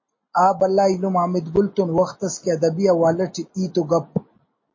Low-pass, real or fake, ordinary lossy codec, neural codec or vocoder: 7.2 kHz; real; MP3, 32 kbps; none